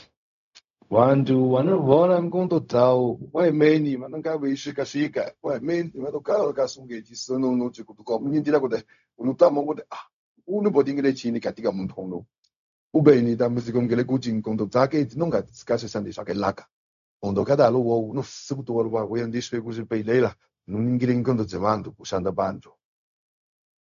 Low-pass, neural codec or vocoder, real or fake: 7.2 kHz; codec, 16 kHz, 0.4 kbps, LongCat-Audio-Codec; fake